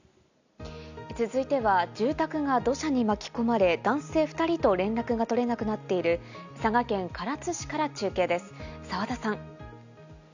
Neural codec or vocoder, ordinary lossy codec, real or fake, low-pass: none; none; real; 7.2 kHz